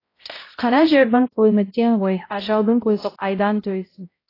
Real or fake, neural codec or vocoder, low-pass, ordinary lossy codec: fake; codec, 16 kHz, 0.5 kbps, X-Codec, HuBERT features, trained on balanced general audio; 5.4 kHz; AAC, 24 kbps